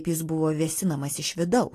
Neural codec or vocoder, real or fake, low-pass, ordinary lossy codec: none; real; 14.4 kHz; AAC, 48 kbps